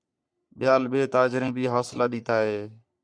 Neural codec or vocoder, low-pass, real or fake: codec, 44.1 kHz, 3.4 kbps, Pupu-Codec; 9.9 kHz; fake